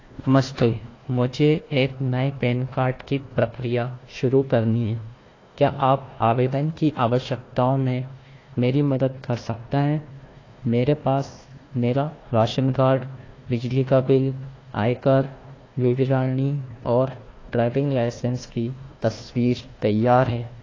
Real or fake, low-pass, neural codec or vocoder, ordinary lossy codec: fake; 7.2 kHz; codec, 16 kHz, 1 kbps, FunCodec, trained on Chinese and English, 50 frames a second; AAC, 32 kbps